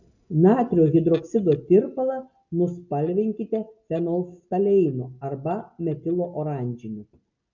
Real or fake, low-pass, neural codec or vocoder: real; 7.2 kHz; none